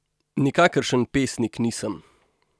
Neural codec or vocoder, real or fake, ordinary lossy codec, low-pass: none; real; none; none